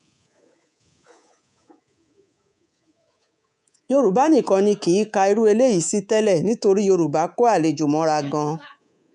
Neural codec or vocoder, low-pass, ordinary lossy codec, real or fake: codec, 24 kHz, 3.1 kbps, DualCodec; 10.8 kHz; none; fake